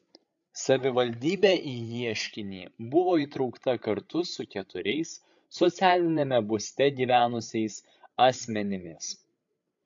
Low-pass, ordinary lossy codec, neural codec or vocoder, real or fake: 7.2 kHz; AAC, 64 kbps; codec, 16 kHz, 8 kbps, FreqCodec, larger model; fake